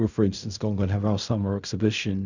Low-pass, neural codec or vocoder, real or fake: 7.2 kHz; codec, 16 kHz in and 24 kHz out, 0.4 kbps, LongCat-Audio-Codec, fine tuned four codebook decoder; fake